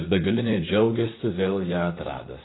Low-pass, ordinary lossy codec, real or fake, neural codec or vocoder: 7.2 kHz; AAC, 16 kbps; fake; vocoder, 44.1 kHz, 128 mel bands, Pupu-Vocoder